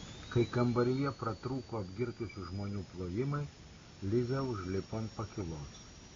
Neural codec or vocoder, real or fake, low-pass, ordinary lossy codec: none; real; 7.2 kHz; AAC, 32 kbps